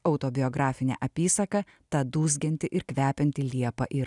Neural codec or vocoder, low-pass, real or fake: none; 10.8 kHz; real